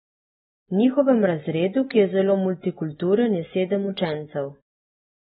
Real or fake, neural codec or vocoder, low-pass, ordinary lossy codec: real; none; 19.8 kHz; AAC, 16 kbps